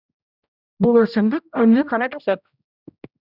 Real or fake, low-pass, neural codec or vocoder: fake; 5.4 kHz; codec, 16 kHz, 0.5 kbps, X-Codec, HuBERT features, trained on general audio